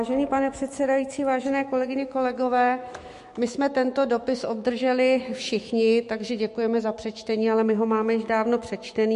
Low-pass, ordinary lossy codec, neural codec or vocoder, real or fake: 14.4 kHz; MP3, 48 kbps; autoencoder, 48 kHz, 128 numbers a frame, DAC-VAE, trained on Japanese speech; fake